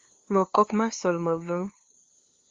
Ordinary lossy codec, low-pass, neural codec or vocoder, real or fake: Opus, 32 kbps; 7.2 kHz; codec, 16 kHz, 2 kbps, FunCodec, trained on LibriTTS, 25 frames a second; fake